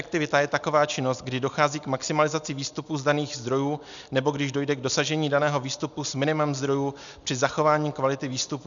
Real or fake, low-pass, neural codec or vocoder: real; 7.2 kHz; none